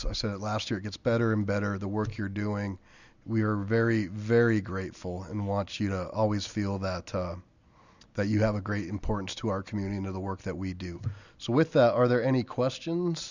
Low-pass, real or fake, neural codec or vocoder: 7.2 kHz; real; none